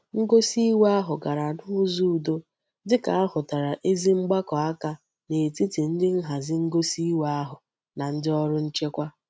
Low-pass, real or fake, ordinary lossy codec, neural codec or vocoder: none; real; none; none